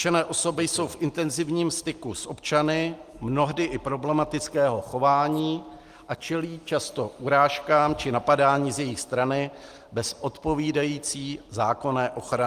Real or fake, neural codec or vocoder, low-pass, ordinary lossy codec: real; none; 14.4 kHz; Opus, 24 kbps